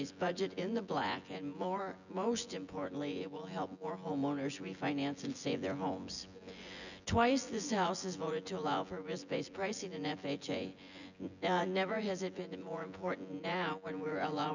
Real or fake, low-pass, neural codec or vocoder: fake; 7.2 kHz; vocoder, 24 kHz, 100 mel bands, Vocos